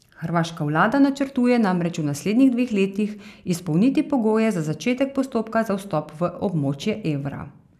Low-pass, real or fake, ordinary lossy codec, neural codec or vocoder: 14.4 kHz; real; none; none